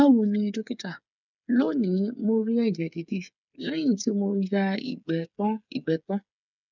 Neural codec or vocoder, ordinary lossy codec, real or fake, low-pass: codec, 16 kHz, 8 kbps, FreqCodec, smaller model; none; fake; 7.2 kHz